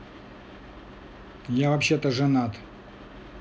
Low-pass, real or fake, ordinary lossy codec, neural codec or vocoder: none; real; none; none